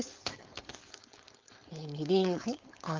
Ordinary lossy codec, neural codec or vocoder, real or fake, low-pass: Opus, 24 kbps; codec, 16 kHz, 4.8 kbps, FACodec; fake; 7.2 kHz